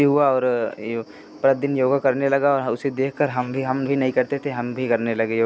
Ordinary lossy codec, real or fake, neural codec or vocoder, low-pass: none; real; none; none